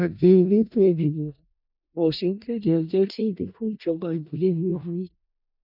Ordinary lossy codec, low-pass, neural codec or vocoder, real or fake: none; 5.4 kHz; codec, 16 kHz in and 24 kHz out, 0.4 kbps, LongCat-Audio-Codec, four codebook decoder; fake